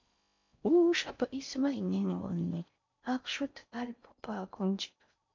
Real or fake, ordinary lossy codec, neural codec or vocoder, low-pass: fake; MP3, 48 kbps; codec, 16 kHz in and 24 kHz out, 0.6 kbps, FocalCodec, streaming, 4096 codes; 7.2 kHz